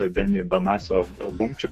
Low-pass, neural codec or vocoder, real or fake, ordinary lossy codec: 14.4 kHz; codec, 44.1 kHz, 2.6 kbps, SNAC; fake; MP3, 64 kbps